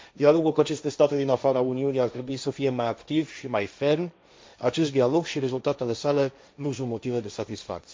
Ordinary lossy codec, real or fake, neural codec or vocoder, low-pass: none; fake; codec, 16 kHz, 1.1 kbps, Voila-Tokenizer; none